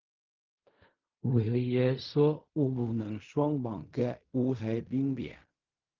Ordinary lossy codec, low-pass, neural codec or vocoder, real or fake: Opus, 32 kbps; 7.2 kHz; codec, 16 kHz in and 24 kHz out, 0.4 kbps, LongCat-Audio-Codec, fine tuned four codebook decoder; fake